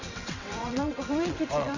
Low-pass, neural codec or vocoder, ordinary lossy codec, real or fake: 7.2 kHz; vocoder, 22.05 kHz, 80 mel bands, WaveNeXt; none; fake